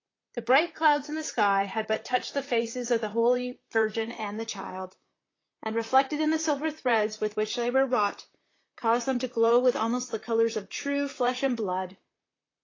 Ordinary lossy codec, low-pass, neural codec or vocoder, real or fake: AAC, 32 kbps; 7.2 kHz; vocoder, 44.1 kHz, 128 mel bands, Pupu-Vocoder; fake